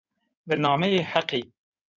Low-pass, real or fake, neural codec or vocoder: 7.2 kHz; fake; codec, 16 kHz in and 24 kHz out, 2.2 kbps, FireRedTTS-2 codec